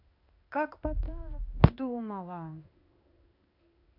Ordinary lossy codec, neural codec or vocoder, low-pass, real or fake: none; codec, 16 kHz in and 24 kHz out, 1 kbps, XY-Tokenizer; 5.4 kHz; fake